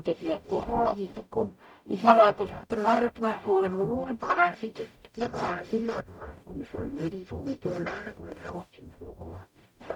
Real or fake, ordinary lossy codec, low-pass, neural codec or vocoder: fake; none; 19.8 kHz; codec, 44.1 kHz, 0.9 kbps, DAC